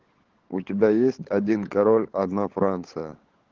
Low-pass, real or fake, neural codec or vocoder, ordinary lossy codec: 7.2 kHz; fake; codec, 16 kHz, 16 kbps, FunCodec, trained on LibriTTS, 50 frames a second; Opus, 16 kbps